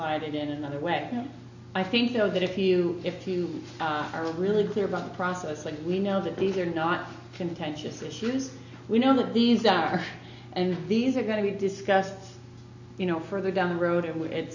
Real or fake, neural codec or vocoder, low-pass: real; none; 7.2 kHz